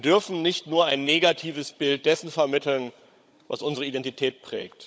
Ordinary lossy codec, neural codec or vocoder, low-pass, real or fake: none; codec, 16 kHz, 16 kbps, FunCodec, trained on Chinese and English, 50 frames a second; none; fake